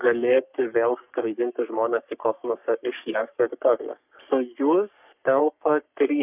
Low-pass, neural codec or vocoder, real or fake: 3.6 kHz; codec, 44.1 kHz, 3.4 kbps, Pupu-Codec; fake